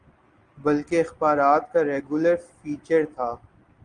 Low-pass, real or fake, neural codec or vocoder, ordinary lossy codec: 9.9 kHz; real; none; Opus, 24 kbps